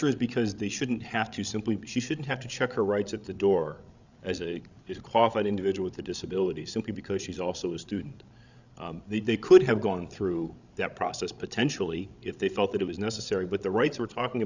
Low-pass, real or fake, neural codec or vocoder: 7.2 kHz; fake; codec, 16 kHz, 16 kbps, FreqCodec, larger model